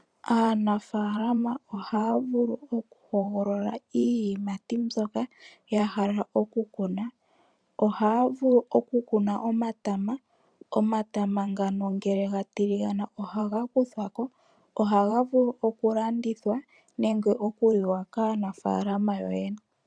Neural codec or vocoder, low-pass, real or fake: vocoder, 44.1 kHz, 128 mel bands every 512 samples, BigVGAN v2; 9.9 kHz; fake